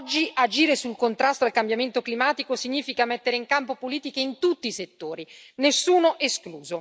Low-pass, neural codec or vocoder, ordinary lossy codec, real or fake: none; none; none; real